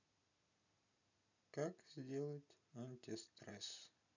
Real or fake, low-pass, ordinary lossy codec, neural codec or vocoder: real; 7.2 kHz; none; none